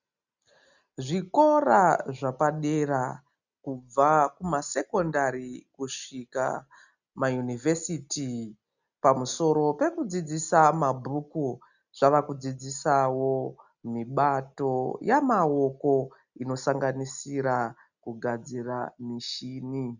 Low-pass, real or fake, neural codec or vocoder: 7.2 kHz; real; none